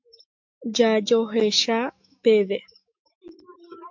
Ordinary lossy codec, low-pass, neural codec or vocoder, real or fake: MP3, 48 kbps; 7.2 kHz; autoencoder, 48 kHz, 128 numbers a frame, DAC-VAE, trained on Japanese speech; fake